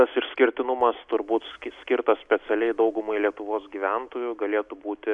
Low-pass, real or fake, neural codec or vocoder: 10.8 kHz; real; none